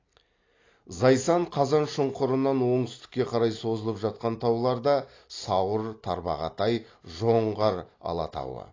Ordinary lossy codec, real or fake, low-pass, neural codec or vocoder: AAC, 32 kbps; real; 7.2 kHz; none